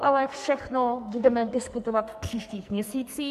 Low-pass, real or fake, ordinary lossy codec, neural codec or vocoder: 14.4 kHz; fake; MP3, 96 kbps; codec, 44.1 kHz, 2.6 kbps, SNAC